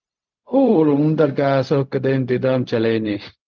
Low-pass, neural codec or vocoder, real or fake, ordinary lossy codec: 7.2 kHz; codec, 16 kHz, 0.4 kbps, LongCat-Audio-Codec; fake; Opus, 32 kbps